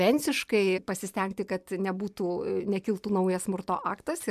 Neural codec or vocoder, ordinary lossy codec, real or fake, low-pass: none; MP3, 96 kbps; real; 14.4 kHz